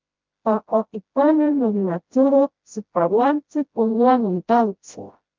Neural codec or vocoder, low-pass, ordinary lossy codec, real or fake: codec, 16 kHz, 0.5 kbps, FreqCodec, smaller model; 7.2 kHz; Opus, 24 kbps; fake